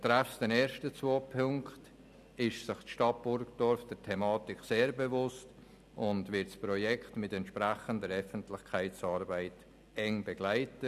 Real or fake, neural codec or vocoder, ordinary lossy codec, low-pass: real; none; none; 14.4 kHz